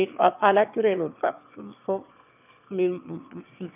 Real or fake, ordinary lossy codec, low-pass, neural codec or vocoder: fake; none; 3.6 kHz; autoencoder, 22.05 kHz, a latent of 192 numbers a frame, VITS, trained on one speaker